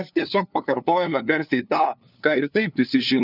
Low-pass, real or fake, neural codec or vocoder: 5.4 kHz; fake; codec, 16 kHz, 2 kbps, FunCodec, trained on LibriTTS, 25 frames a second